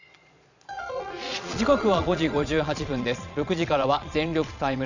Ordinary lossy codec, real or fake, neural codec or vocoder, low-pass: none; fake; vocoder, 22.05 kHz, 80 mel bands, Vocos; 7.2 kHz